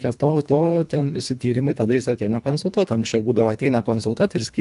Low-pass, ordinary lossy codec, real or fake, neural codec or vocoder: 10.8 kHz; AAC, 96 kbps; fake; codec, 24 kHz, 1.5 kbps, HILCodec